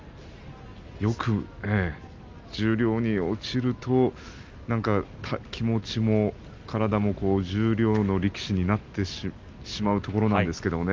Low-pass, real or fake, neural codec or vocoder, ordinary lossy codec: 7.2 kHz; real; none; Opus, 32 kbps